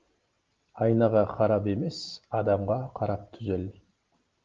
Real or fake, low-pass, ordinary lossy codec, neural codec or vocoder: real; 7.2 kHz; Opus, 32 kbps; none